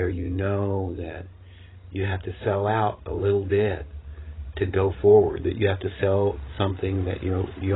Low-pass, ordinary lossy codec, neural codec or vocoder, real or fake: 7.2 kHz; AAC, 16 kbps; codec, 16 kHz, 16 kbps, FreqCodec, larger model; fake